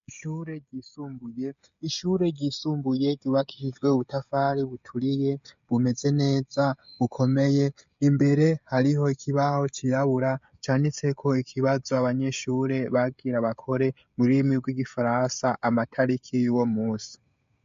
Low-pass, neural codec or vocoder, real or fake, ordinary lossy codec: 7.2 kHz; codec, 16 kHz, 16 kbps, FreqCodec, smaller model; fake; MP3, 48 kbps